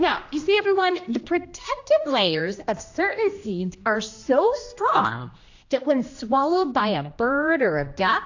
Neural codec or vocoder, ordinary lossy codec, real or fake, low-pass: codec, 16 kHz, 1 kbps, X-Codec, HuBERT features, trained on general audio; AAC, 48 kbps; fake; 7.2 kHz